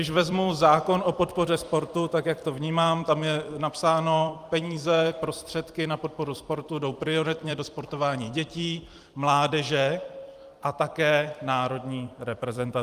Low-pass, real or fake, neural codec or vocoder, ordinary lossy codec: 14.4 kHz; fake; vocoder, 44.1 kHz, 128 mel bands every 256 samples, BigVGAN v2; Opus, 32 kbps